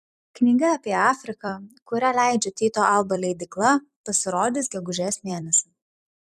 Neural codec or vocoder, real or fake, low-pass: vocoder, 44.1 kHz, 128 mel bands every 256 samples, BigVGAN v2; fake; 14.4 kHz